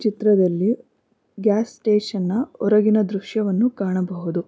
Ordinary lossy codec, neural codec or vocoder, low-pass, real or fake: none; none; none; real